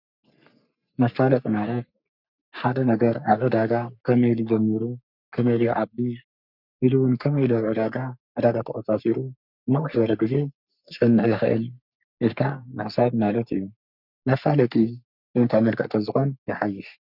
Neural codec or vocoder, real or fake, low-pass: codec, 44.1 kHz, 3.4 kbps, Pupu-Codec; fake; 5.4 kHz